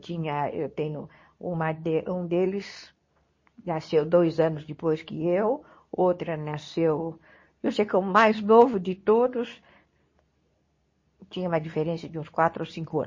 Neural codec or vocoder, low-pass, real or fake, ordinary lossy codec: codec, 24 kHz, 0.9 kbps, WavTokenizer, medium speech release version 2; 7.2 kHz; fake; MP3, 32 kbps